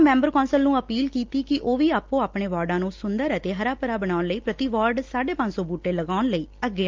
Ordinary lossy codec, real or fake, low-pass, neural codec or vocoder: Opus, 32 kbps; real; 7.2 kHz; none